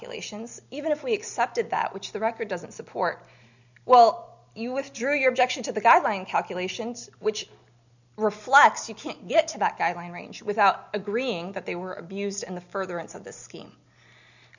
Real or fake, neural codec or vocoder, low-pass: real; none; 7.2 kHz